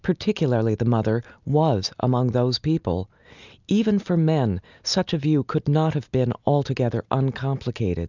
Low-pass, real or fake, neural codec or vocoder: 7.2 kHz; real; none